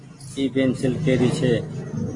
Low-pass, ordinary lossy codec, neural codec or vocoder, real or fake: 10.8 kHz; AAC, 32 kbps; none; real